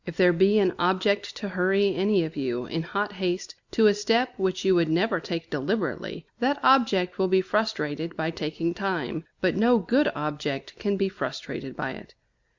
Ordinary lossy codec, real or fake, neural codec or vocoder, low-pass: Opus, 64 kbps; real; none; 7.2 kHz